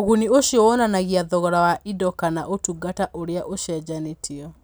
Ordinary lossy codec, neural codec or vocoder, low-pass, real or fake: none; none; none; real